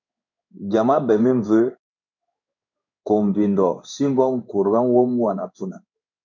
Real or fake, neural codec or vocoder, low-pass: fake; codec, 16 kHz in and 24 kHz out, 1 kbps, XY-Tokenizer; 7.2 kHz